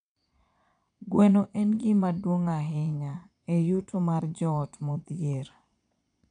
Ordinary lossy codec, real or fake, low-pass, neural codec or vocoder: none; fake; 9.9 kHz; vocoder, 22.05 kHz, 80 mel bands, Vocos